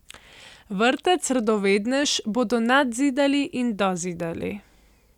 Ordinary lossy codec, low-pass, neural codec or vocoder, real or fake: none; 19.8 kHz; none; real